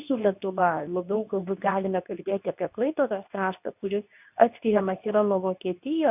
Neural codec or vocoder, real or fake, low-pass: codec, 24 kHz, 0.9 kbps, WavTokenizer, medium speech release version 2; fake; 3.6 kHz